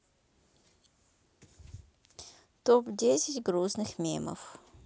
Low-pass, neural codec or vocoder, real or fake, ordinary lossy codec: none; none; real; none